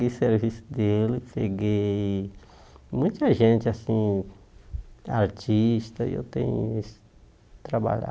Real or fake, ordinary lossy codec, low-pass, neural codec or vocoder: real; none; none; none